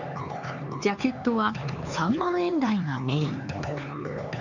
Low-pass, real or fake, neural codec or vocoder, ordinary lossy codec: 7.2 kHz; fake; codec, 16 kHz, 4 kbps, X-Codec, HuBERT features, trained on LibriSpeech; none